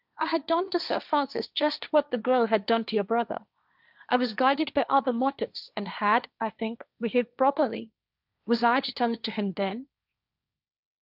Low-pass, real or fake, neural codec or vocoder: 5.4 kHz; fake; codec, 16 kHz, 1.1 kbps, Voila-Tokenizer